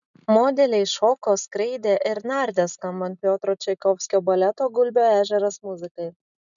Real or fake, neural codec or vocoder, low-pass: real; none; 7.2 kHz